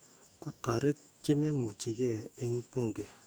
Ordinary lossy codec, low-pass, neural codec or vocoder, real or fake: none; none; codec, 44.1 kHz, 2.6 kbps, DAC; fake